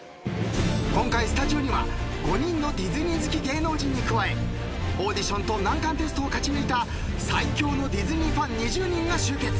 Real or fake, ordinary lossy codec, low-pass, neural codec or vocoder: real; none; none; none